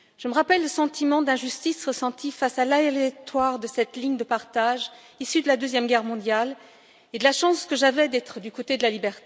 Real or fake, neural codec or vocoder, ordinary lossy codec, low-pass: real; none; none; none